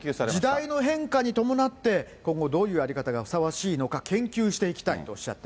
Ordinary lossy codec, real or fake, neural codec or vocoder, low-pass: none; real; none; none